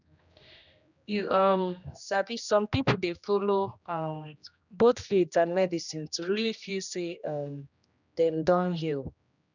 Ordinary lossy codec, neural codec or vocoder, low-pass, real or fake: none; codec, 16 kHz, 1 kbps, X-Codec, HuBERT features, trained on general audio; 7.2 kHz; fake